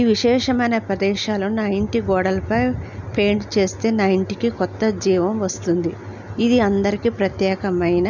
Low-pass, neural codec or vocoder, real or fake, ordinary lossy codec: 7.2 kHz; none; real; none